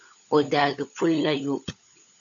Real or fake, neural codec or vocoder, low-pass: fake; codec, 16 kHz, 16 kbps, FunCodec, trained on LibriTTS, 50 frames a second; 7.2 kHz